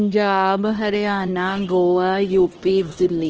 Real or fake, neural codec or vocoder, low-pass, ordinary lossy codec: fake; codec, 16 kHz, 1 kbps, X-Codec, HuBERT features, trained on LibriSpeech; 7.2 kHz; Opus, 16 kbps